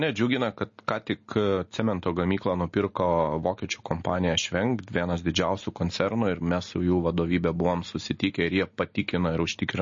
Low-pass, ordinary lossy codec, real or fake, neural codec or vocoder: 7.2 kHz; MP3, 32 kbps; real; none